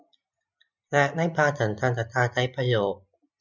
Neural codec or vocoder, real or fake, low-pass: none; real; 7.2 kHz